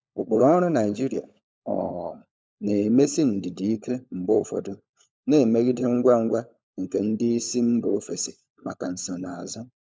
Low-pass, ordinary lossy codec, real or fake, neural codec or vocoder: none; none; fake; codec, 16 kHz, 16 kbps, FunCodec, trained on LibriTTS, 50 frames a second